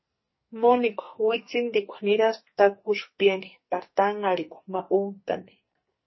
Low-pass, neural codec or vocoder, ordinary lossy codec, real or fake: 7.2 kHz; codec, 44.1 kHz, 2.6 kbps, SNAC; MP3, 24 kbps; fake